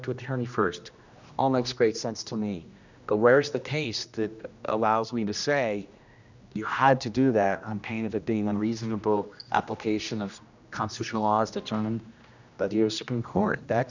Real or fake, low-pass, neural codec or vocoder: fake; 7.2 kHz; codec, 16 kHz, 1 kbps, X-Codec, HuBERT features, trained on general audio